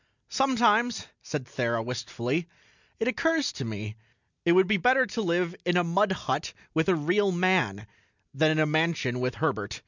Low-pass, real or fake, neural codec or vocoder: 7.2 kHz; real; none